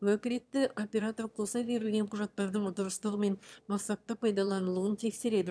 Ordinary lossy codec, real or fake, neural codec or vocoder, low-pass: none; fake; autoencoder, 22.05 kHz, a latent of 192 numbers a frame, VITS, trained on one speaker; none